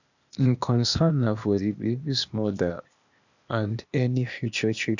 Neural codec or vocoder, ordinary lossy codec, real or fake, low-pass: codec, 16 kHz, 0.8 kbps, ZipCodec; none; fake; 7.2 kHz